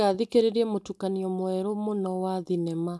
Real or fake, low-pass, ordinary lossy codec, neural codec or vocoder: real; none; none; none